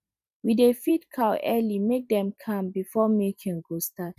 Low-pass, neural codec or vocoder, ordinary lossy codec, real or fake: 14.4 kHz; vocoder, 44.1 kHz, 128 mel bands every 512 samples, BigVGAN v2; none; fake